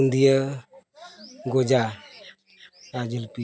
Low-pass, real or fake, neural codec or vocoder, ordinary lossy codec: none; real; none; none